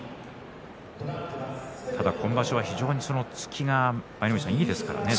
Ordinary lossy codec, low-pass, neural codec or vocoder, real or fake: none; none; none; real